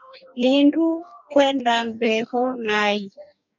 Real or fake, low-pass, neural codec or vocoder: fake; 7.2 kHz; codec, 44.1 kHz, 2.6 kbps, DAC